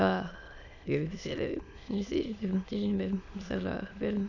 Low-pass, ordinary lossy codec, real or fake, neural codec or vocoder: 7.2 kHz; none; fake; autoencoder, 22.05 kHz, a latent of 192 numbers a frame, VITS, trained on many speakers